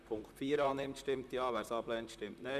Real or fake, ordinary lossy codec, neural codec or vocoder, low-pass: fake; none; vocoder, 44.1 kHz, 128 mel bands, Pupu-Vocoder; 14.4 kHz